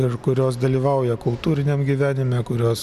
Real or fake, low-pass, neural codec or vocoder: real; 14.4 kHz; none